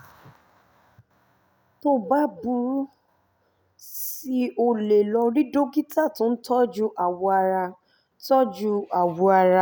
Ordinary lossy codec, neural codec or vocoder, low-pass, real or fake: none; none; none; real